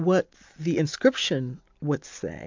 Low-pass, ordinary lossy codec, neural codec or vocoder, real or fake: 7.2 kHz; MP3, 64 kbps; none; real